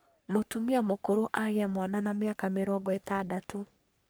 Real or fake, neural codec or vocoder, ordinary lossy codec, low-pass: fake; codec, 44.1 kHz, 3.4 kbps, Pupu-Codec; none; none